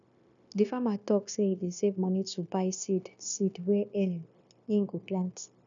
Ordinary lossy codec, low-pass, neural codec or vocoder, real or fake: none; 7.2 kHz; codec, 16 kHz, 0.9 kbps, LongCat-Audio-Codec; fake